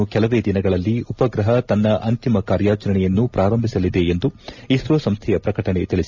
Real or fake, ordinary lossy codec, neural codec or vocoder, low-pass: real; none; none; 7.2 kHz